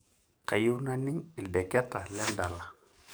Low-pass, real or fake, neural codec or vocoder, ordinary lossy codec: none; fake; codec, 44.1 kHz, 7.8 kbps, DAC; none